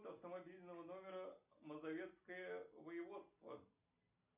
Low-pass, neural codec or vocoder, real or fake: 3.6 kHz; none; real